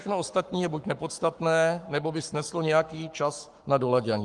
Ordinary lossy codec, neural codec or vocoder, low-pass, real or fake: Opus, 32 kbps; codec, 44.1 kHz, 7.8 kbps, Pupu-Codec; 10.8 kHz; fake